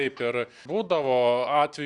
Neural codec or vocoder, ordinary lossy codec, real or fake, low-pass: none; Opus, 64 kbps; real; 10.8 kHz